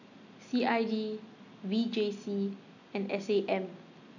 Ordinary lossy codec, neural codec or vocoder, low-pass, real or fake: none; none; 7.2 kHz; real